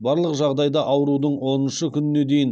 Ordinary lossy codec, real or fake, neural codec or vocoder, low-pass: none; real; none; 9.9 kHz